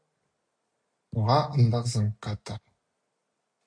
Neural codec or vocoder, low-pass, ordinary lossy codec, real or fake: none; 9.9 kHz; MP3, 48 kbps; real